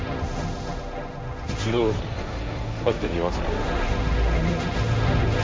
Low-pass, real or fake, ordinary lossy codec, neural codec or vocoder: none; fake; none; codec, 16 kHz, 1.1 kbps, Voila-Tokenizer